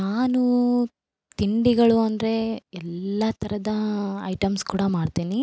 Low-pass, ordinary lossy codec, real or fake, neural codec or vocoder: none; none; real; none